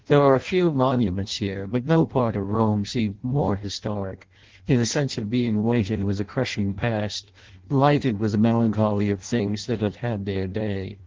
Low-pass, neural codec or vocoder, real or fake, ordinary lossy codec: 7.2 kHz; codec, 16 kHz in and 24 kHz out, 0.6 kbps, FireRedTTS-2 codec; fake; Opus, 16 kbps